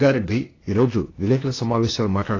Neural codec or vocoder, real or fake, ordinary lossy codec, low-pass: codec, 16 kHz, 0.8 kbps, ZipCodec; fake; AAC, 32 kbps; 7.2 kHz